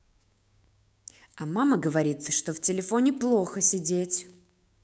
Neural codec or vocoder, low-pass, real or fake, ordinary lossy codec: codec, 16 kHz, 6 kbps, DAC; none; fake; none